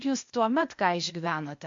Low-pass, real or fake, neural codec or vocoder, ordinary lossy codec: 7.2 kHz; fake; codec, 16 kHz, 0.8 kbps, ZipCodec; MP3, 48 kbps